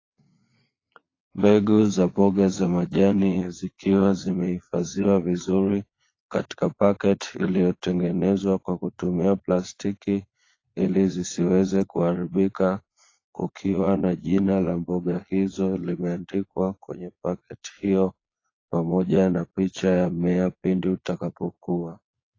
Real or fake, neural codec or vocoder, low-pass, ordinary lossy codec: fake; vocoder, 22.05 kHz, 80 mel bands, Vocos; 7.2 kHz; AAC, 32 kbps